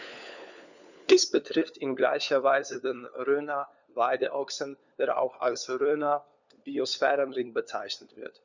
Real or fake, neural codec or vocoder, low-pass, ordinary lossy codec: fake; codec, 16 kHz, 4 kbps, FunCodec, trained on LibriTTS, 50 frames a second; 7.2 kHz; none